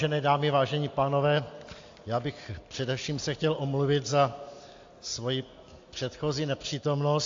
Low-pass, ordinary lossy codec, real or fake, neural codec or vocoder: 7.2 kHz; AAC, 48 kbps; real; none